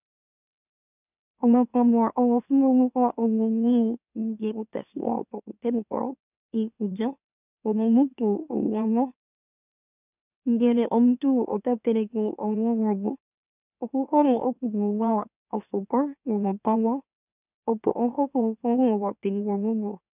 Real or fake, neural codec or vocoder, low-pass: fake; autoencoder, 44.1 kHz, a latent of 192 numbers a frame, MeloTTS; 3.6 kHz